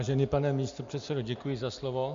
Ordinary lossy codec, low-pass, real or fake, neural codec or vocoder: MP3, 64 kbps; 7.2 kHz; real; none